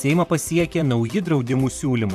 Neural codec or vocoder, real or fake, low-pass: vocoder, 44.1 kHz, 128 mel bands, Pupu-Vocoder; fake; 14.4 kHz